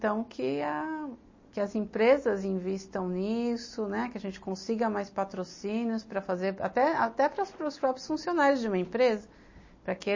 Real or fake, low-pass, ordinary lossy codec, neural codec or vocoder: real; 7.2 kHz; MP3, 32 kbps; none